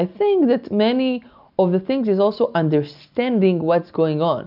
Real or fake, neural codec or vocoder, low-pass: real; none; 5.4 kHz